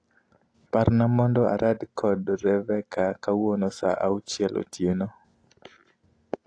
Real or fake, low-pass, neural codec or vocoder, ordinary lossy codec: real; 9.9 kHz; none; AAC, 48 kbps